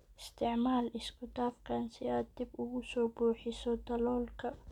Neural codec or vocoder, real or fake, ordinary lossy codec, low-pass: none; real; none; 19.8 kHz